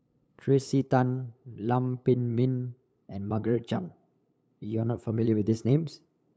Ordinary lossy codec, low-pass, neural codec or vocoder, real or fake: none; none; codec, 16 kHz, 8 kbps, FunCodec, trained on LibriTTS, 25 frames a second; fake